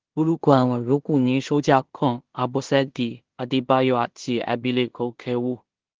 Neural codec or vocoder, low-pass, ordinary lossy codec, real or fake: codec, 16 kHz in and 24 kHz out, 0.4 kbps, LongCat-Audio-Codec, two codebook decoder; 7.2 kHz; Opus, 16 kbps; fake